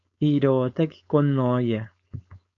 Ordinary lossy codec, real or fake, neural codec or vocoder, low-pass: MP3, 96 kbps; fake; codec, 16 kHz, 4.8 kbps, FACodec; 7.2 kHz